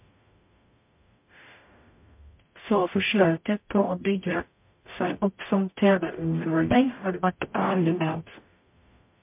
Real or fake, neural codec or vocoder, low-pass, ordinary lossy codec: fake; codec, 44.1 kHz, 0.9 kbps, DAC; 3.6 kHz; MP3, 32 kbps